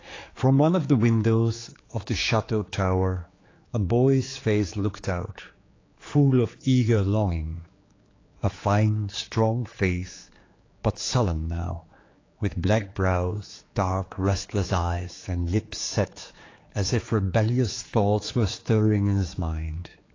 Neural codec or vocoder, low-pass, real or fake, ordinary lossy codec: codec, 16 kHz, 4 kbps, X-Codec, HuBERT features, trained on general audio; 7.2 kHz; fake; AAC, 32 kbps